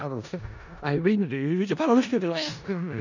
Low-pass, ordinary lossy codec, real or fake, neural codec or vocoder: 7.2 kHz; none; fake; codec, 16 kHz in and 24 kHz out, 0.4 kbps, LongCat-Audio-Codec, four codebook decoder